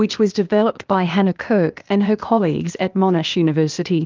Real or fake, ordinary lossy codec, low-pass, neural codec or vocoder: fake; Opus, 24 kbps; 7.2 kHz; codec, 16 kHz, 0.8 kbps, ZipCodec